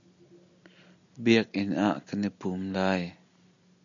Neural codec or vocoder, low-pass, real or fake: none; 7.2 kHz; real